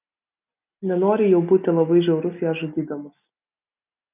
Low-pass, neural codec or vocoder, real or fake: 3.6 kHz; none; real